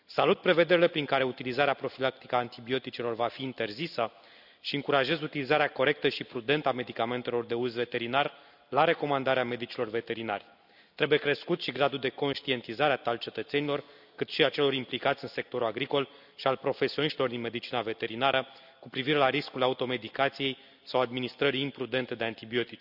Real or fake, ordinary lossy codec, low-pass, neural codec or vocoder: real; none; 5.4 kHz; none